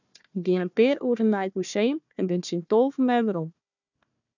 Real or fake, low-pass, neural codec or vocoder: fake; 7.2 kHz; codec, 16 kHz, 1 kbps, FunCodec, trained on Chinese and English, 50 frames a second